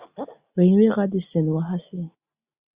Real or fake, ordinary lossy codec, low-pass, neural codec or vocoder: real; Opus, 64 kbps; 3.6 kHz; none